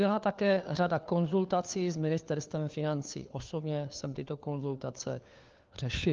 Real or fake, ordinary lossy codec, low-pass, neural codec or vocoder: fake; Opus, 32 kbps; 7.2 kHz; codec, 16 kHz, 4 kbps, FunCodec, trained on LibriTTS, 50 frames a second